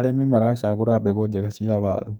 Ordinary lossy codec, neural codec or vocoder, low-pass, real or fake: none; codec, 44.1 kHz, 2.6 kbps, SNAC; none; fake